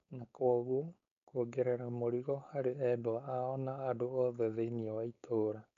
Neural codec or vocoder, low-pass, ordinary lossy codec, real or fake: codec, 16 kHz, 4.8 kbps, FACodec; 7.2 kHz; none; fake